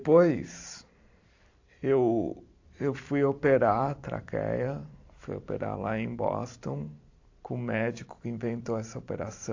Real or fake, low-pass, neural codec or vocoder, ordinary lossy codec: fake; 7.2 kHz; vocoder, 44.1 kHz, 128 mel bands every 512 samples, BigVGAN v2; AAC, 48 kbps